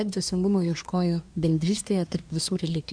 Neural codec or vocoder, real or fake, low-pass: codec, 24 kHz, 1 kbps, SNAC; fake; 9.9 kHz